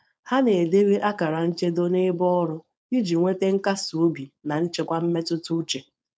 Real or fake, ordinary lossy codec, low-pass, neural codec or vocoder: fake; none; none; codec, 16 kHz, 4.8 kbps, FACodec